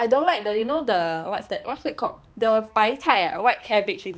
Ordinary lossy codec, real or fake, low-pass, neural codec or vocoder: none; fake; none; codec, 16 kHz, 2 kbps, X-Codec, HuBERT features, trained on balanced general audio